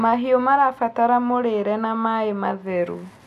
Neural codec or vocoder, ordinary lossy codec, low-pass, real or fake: none; none; 14.4 kHz; real